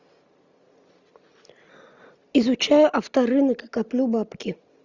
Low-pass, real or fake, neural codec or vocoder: 7.2 kHz; real; none